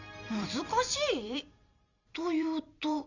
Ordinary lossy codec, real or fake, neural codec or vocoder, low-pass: none; fake; vocoder, 44.1 kHz, 128 mel bands every 256 samples, BigVGAN v2; 7.2 kHz